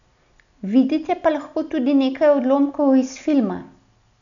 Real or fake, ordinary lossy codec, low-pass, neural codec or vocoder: real; none; 7.2 kHz; none